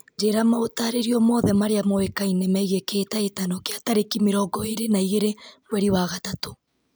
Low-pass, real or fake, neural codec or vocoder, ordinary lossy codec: none; real; none; none